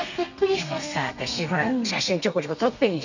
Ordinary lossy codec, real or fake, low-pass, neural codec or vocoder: none; fake; 7.2 kHz; codec, 32 kHz, 1.9 kbps, SNAC